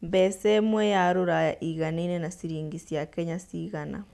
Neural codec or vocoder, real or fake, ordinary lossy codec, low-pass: none; real; none; none